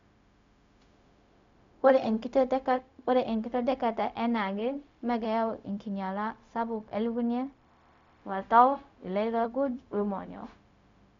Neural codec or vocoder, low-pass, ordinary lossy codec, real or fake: codec, 16 kHz, 0.4 kbps, LongCat-Audio-Codec; 7.2 kHz; MP3, 64 kbps; fake